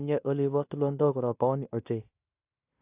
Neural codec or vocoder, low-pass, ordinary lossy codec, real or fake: codec, 16 kHz in and 24 kHz out, 0.9 kbps, LongCat-Audio-Codec, fine tuned four codebook decoder; 3.6 kHz; AAC, 24 kbps; fake